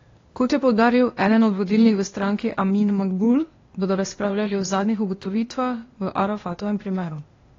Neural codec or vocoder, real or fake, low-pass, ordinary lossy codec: codec, 16 kHz, 0.8 kbps, ZipCodec; fake; 7.2 kHz; AAC, 32 kbps